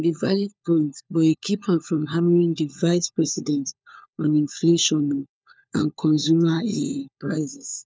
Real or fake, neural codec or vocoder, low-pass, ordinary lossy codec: fake; codec, 16 kHz, 4 kbps, FunCodec, trained on LibriTTS, 50 frames a second; none; none